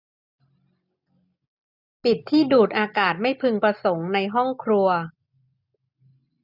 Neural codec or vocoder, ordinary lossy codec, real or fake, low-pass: none; none; real; 5.4 kHz